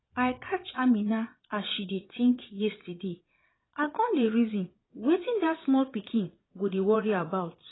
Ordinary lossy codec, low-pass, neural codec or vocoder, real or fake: AAC, 16 kbps; 7.2 kHz; vocoder, 22.05 kHz, 80 mel bands, Vocos; fake